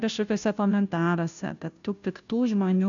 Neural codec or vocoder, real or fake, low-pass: codec, 16 kHz, 0.5 kbps, FunCodec, trained on Chinese and English, 25 frames a second; fake; 7.2 kHz